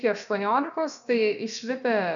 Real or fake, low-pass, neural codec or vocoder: fake; 7.2 kHz; codec, 16 kHz, about 1 kbps, DyCAST, with the encoder's durations